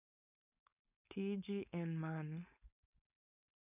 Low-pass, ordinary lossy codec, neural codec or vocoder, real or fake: 3.6 kHz; none; codec, 16 kHz, 4.8 kbps, FACodec; fake